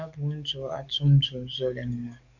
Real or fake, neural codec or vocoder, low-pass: fake; codec, 44.1 kHz, 7.8 kbps, DAC; 7.2 kHz